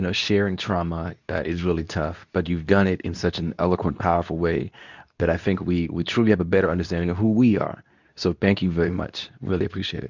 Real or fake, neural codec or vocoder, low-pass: fake; codec, 24 kHz, 0.9 kbps, WavTokenizer, medium speech release version 2; 7.2 kHz